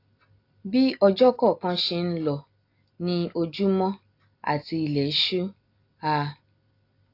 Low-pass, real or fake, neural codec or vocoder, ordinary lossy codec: 5.4 kHz; real; none; AAC, 32 kbps